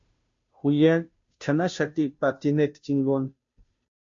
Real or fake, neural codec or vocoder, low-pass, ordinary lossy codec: fake; codec, 16 kHz, 0.5 kbps, FunCodec, trained on Chinese and English, 25 frames a second; 7.2 kHz; MP3, 48 kbps